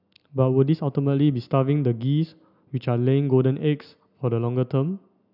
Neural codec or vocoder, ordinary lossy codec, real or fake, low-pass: none; none; real; 5.4 kHz